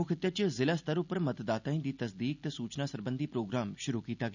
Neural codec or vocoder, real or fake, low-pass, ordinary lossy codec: none; real; 7.2 kHz; none